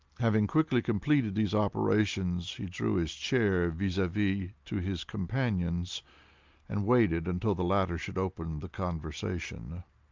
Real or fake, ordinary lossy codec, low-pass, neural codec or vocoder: real; Opus, 24 kbps; 7.2 kHz; none